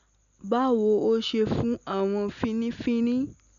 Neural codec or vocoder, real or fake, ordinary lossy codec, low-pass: none; real; none; 7.2 kHz